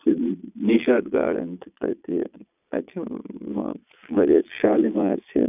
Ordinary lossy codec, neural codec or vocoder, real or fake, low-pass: none; vocoder, 22.05 kHz, 80 mel bands, Vocos; fake; 3.6 kHz